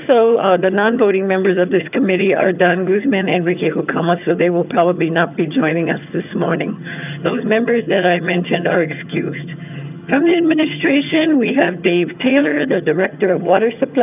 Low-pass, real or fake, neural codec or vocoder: 3.6 kHz; fake; vocoder, 22.05 kHz, 80 mel bands, HiFi-GAN